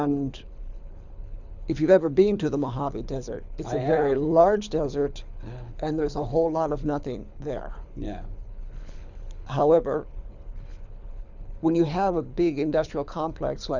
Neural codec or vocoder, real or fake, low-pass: codec, 24 kHz, 6 kbps, HILCodec; fake; 7.2 kHz